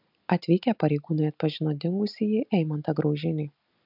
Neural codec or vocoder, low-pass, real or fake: none; 5.4 kHz; real